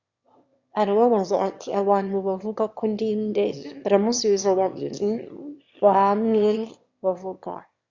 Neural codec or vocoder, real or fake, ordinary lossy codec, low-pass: autoencoder, 22.05 kHz, a latent of 192 numbers a frame, VITS, trained on one speaker; fake; Opus, 64 kbps; 7.2 kHz